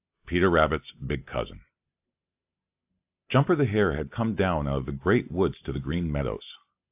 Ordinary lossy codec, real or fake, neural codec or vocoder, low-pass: AAC, 32 kbps; real; none; 3.6 kHz